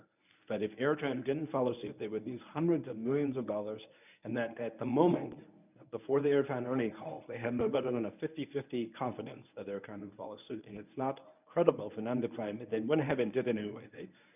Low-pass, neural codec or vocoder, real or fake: 3.6 kHz; codec, 24 kHz, 0.9 kbps, WavTokenizer, medium speech release version 1; fake